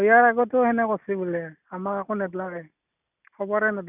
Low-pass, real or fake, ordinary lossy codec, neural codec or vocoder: 3.6 kHz; real; none; none